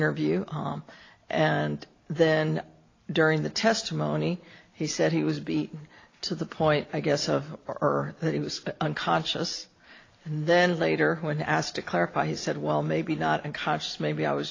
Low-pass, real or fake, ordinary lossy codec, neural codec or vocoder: 7.2 kHz; real; AAC, 32 kbps; none